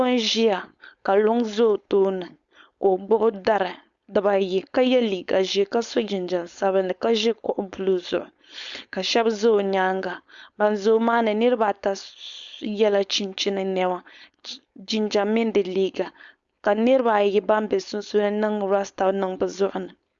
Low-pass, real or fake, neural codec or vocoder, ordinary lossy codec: 7.2 kHz; fake; codec, 16 kHz, 4.8 kbps, FACodec; Opus, 64 kbps